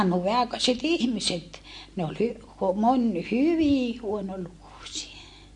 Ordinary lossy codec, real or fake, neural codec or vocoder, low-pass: MP3, 48 kbps; real; none; 10.8 kHz